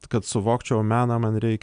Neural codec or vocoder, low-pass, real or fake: none; 9.9 kHz; real